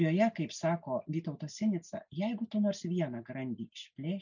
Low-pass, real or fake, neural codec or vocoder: 7.2 kHz; real; none